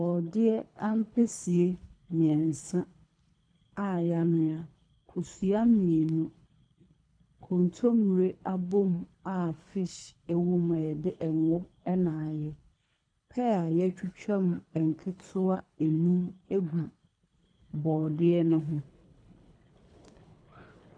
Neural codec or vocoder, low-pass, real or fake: codec, 24 kHz, 3 kbps, HILCodec; 9.9 kHz; fake